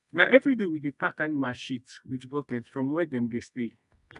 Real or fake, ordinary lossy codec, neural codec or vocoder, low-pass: fake; none; codec, 24 kHz, 0.9 kbps, WavTokenizer, medium music audio release; 10.8 kHz